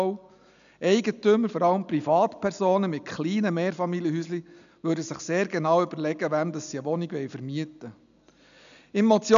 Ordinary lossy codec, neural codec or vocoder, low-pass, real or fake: none; none; 7.2 kHz; real